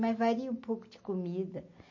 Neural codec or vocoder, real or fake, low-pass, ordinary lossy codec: none; real; 7.2 kHz; MP3, 32 kbps